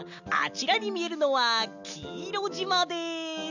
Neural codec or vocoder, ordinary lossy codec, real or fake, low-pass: none; none; real; 7.2 kHz